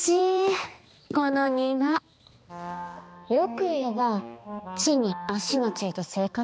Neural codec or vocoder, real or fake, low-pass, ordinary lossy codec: codec, 16 kHz, 2 kbps, X-Codec, HuBERT features, trained on balanced general audio; fake; none; none